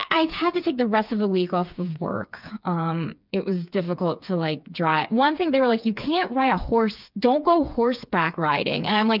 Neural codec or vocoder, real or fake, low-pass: codec, 16 kHz, 4 kbps, FreqCodec, smaller model; fake; 5.4 kHz